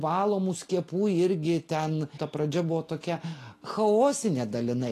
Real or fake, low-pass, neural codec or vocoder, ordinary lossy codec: real; 14.4 kHz; none; AAC, 48 kbps